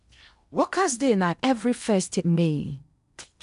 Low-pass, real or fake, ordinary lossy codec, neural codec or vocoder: 10.8 kHz; fake; none; codec, 16 kHz in and 24 kHz out, 0.8 kbps, FocalCodec, streaming, 65536 codes